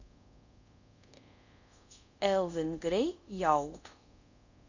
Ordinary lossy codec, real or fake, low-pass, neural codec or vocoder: none; fake; 7.2 kHz; codec, 24 kHz, 0.5 kbps, DualCodec